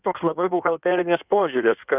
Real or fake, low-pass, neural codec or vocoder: fake; 3.6 kHz; codec, 16 kHz in and 24 kHz out, 1.1 kbps, FireRedTTS-2 codec